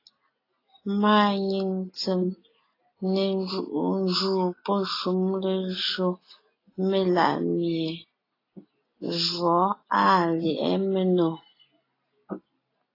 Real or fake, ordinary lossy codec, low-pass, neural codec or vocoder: real; AAC, 24 kbps; 5.4 kHz; none